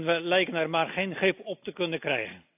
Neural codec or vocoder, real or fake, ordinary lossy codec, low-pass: none; real; AAC, 32 kbps; 3.6 kHz